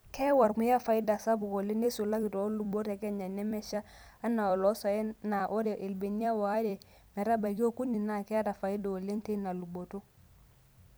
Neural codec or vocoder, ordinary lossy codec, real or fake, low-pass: vocoder, 44.1 kHz, 128 mel bands every 256 samples, BigVGAN v2; none; fake; none